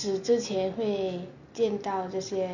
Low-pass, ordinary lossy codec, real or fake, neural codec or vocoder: 7.2 kHz; none; real; none